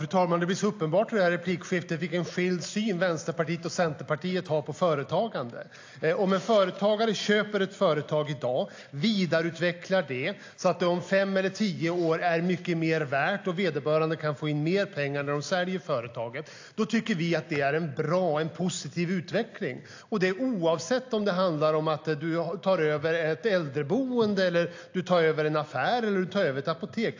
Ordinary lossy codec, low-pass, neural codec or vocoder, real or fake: AAC, 48 kbps; 7.2 kHz; none; real